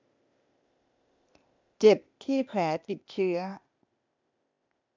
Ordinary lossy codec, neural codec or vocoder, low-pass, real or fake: MP3, 64 kbps; codec, 16 kHz, 0.8 kbps, ZipCodec; 7.2 kHz; fake